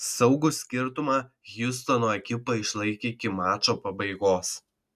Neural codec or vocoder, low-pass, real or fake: none; 14.4 kHz; real